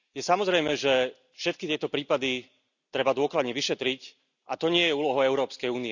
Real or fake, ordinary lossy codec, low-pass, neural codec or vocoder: real; none; 7.2 kHz; none